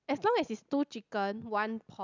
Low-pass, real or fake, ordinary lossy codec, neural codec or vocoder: 7.2 kHz; real; none; none